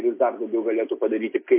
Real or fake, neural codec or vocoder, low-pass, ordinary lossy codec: real; none; 3.6 kHz; MP3, 24 kbps